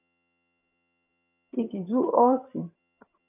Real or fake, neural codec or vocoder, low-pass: fake; vocoder, 22.05 kHz, 80 mel bands, HiFi-GAN; 3.6 kHz